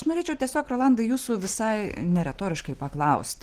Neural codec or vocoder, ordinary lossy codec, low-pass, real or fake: none; Opus, 16 kbps; 14.4 kHz; real